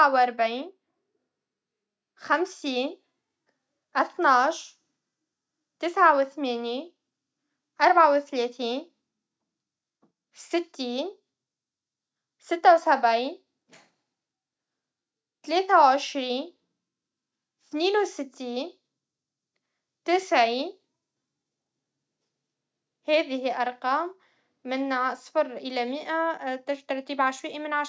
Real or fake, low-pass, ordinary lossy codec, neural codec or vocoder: real; none; none; none